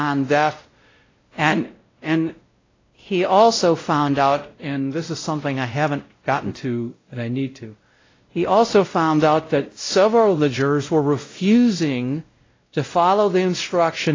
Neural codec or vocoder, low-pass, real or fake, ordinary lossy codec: codec, 16 kHz, 0.5 kbps, X-Codec, WavLM features, trained on Multilingual LibriSpeech; 7.2 kHz; fake; AAC, 32 kbps